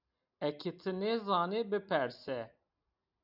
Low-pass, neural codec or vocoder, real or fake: 5.4 kHz; none; real